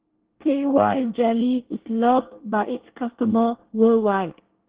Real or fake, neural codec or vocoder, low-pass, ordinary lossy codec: fake; codec, 24 kHz, 1 kbps, SNAC; 3.6 kHz; Opus, 16 kbps